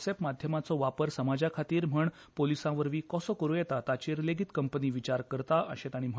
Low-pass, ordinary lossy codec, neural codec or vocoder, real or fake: none; none; none; real